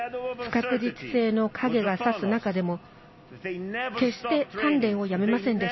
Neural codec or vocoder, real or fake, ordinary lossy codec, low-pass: none; real; MP3, 24 kbps; 7.2 kHz